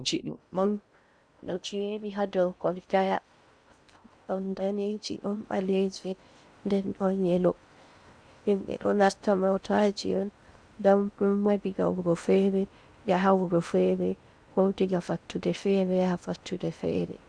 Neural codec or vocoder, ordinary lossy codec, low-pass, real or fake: codec, 16 kHz in and 24 kHz out, 0.6 kbps, FocalCodec, streaming, 4096 codes; Opus, 64 kbps; 9.9 kHz; fake